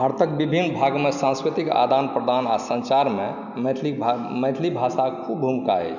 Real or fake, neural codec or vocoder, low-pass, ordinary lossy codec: real; none; 7.2 kHz; none